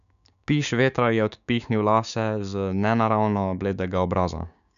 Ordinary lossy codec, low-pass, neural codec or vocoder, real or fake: none; 7.2 kHz; codec, 16 kHz, 6 kbps, DAC; fake